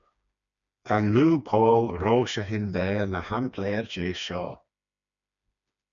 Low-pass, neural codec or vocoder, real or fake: 7.2 kHz; codec, 16 kHz, 2 kbps, FreqCodec, smaller model; fake